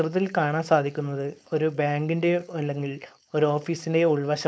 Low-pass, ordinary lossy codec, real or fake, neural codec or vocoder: none; none; fake; codec, 16 kHz, 4.8 kbps, FACodec